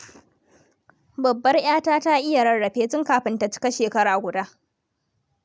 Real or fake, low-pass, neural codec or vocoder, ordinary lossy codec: real; none; none; none